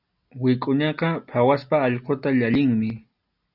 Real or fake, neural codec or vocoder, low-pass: real; none; 5.4 kHz